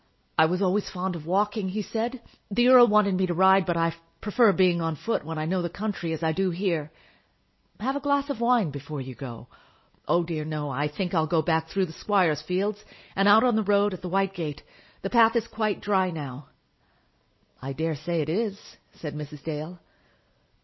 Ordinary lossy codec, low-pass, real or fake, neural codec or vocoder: MP3, 24 kbps; 7.2 kHz; real; none